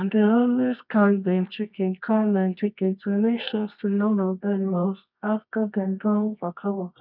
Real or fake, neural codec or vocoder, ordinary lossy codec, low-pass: fake; codec, 24 kHz, 0.9 kbps, WavTokenizer, medium music audio release; none; 5.4 kHz